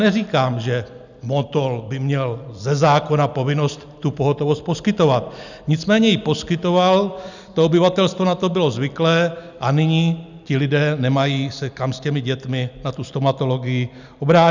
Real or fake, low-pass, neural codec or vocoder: real; 7.2 kHz; none